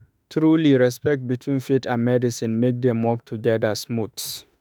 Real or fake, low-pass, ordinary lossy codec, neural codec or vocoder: fake; none; none; autoencoder, 48 kHz, 32 numbers a frame, DAC-VAE, trained on Japanese speech